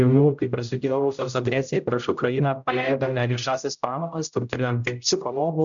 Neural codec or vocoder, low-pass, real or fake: codec, 16 kHz, 0.5 kbps, X-Codec, HuBERT features, trained on general audio; 7.2 kHz; fake